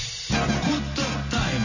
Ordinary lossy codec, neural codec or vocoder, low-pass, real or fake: none; none; 7.2 kHz; real